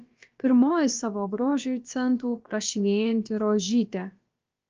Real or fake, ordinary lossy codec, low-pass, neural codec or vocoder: fake; Opus, 24 kbps; 7.2 kHz; codec, 16 kHz, about 1 kbps, DyCAST, with the encoder's durations